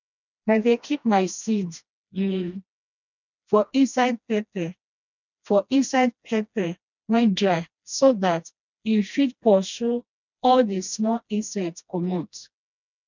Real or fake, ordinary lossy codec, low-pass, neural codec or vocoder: fake; none; 7.2 kHz; codec, 16 kHz, 1 kbps, FreqCodec, smaller model